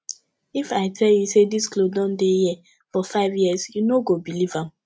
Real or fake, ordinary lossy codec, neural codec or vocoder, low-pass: real; none; none; none